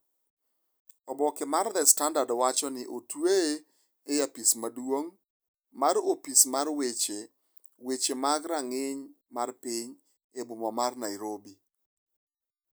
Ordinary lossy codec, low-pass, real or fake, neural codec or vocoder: none; none; real; none